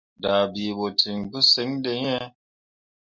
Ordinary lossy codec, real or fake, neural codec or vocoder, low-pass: MP3, 64 kbps; real; none; 7.2 kHz